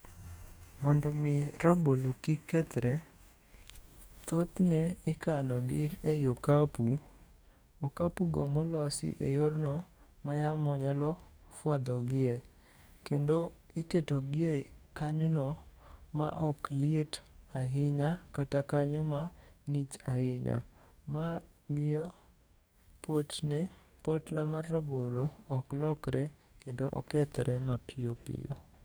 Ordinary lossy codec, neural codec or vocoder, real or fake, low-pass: none; codec, 44.1 kHz, 2.6 kbps, DAC; fake; none